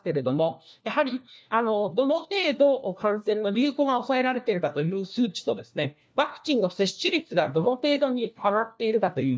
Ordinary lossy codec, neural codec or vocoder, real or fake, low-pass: none; codec, 16 kHz, 1 kbps, FunCodec, trained on LibriTTS, 50 frames a second; fake; none